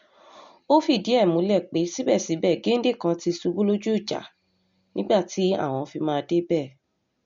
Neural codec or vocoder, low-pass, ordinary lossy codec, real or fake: none; 7.2 kHz; MP3, 48 kbps; real